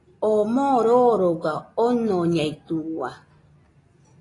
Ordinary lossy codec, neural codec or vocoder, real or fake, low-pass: AAC, 32 kbps; none; real; 10.8 kHz